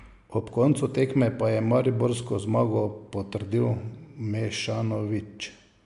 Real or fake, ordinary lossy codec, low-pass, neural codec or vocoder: real; MP3, 64 kbps; 10.8 kHz; none